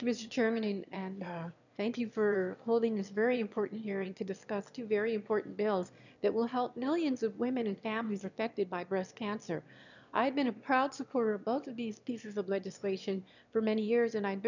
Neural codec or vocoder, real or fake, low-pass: autoencoder, 22.05 kHz, a latent of 192 numbers a frame, VITS, trained on one speaker; fake; 7.2 kHz